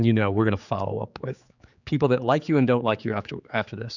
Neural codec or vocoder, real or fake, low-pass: codec, 16 kHz, 4 kbps, X-Codec, HuBERT features, trained on general audio; fake; 7.2 kHz